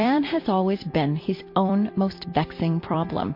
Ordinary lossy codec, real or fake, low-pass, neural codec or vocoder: MP3, 32 kbps; fake; 5.4 kHz; vocoder, 44.1 kHz, 128 mel bands every 256 samples, BigVGAN v2